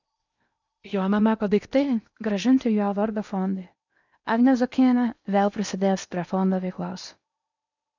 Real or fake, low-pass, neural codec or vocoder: fake; 7.2 kHz; codec, 16 kHz in and 24 kHz out, 0.8 kbps, FocalCodec, streaming, 65536 codes